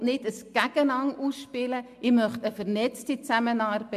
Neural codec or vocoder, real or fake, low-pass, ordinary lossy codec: none; real; 14.4 kHz; MP3, 64 kbps